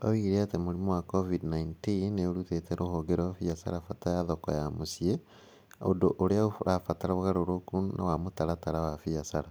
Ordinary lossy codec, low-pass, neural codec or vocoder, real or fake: none; none; none; real